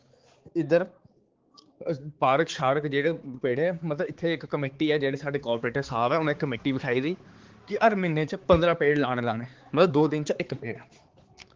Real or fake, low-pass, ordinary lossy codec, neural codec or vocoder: fake; 7.2 kHz; Opus, 16 kbps; codec, 16 kHz, 4 kbps, X-Codec, HuBERT features, trained on balanced general audio